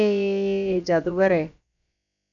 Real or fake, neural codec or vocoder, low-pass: fake; codec, 16 kHz, about 1 kbps, DyCAST, with the encoder's durations; 7.2 kHz